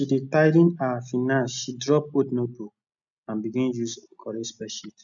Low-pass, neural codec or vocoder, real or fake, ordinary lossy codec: 7.2 kHz; none; real; none